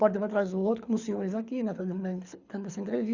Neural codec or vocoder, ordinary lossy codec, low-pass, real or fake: codec, 24 kHz, 6 kbps, HILCodec; Opus, 64 kbps; 7.2 kHz; fake